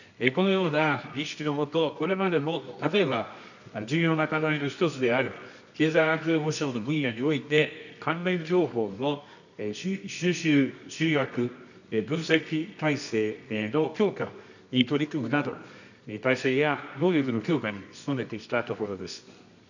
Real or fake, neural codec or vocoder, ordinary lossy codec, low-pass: fake; codec, 24 kHz, 0.9 kbps, WavTokenizer, medium music audio release; none; 7.2 kHz